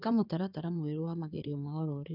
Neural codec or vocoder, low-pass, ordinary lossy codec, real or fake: codec, 16 kHz in and 24 kHz out, 2.2 kbps, FireRedTTS-2 codec; 5.4 kHz; none; fake